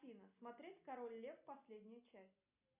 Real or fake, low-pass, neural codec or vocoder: real; 3.6 kHz; none